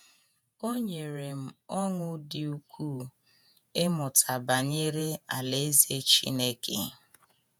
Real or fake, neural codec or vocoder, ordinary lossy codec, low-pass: fake; vocoder, 48 kHz, 128 mel bands, Vocos; none; none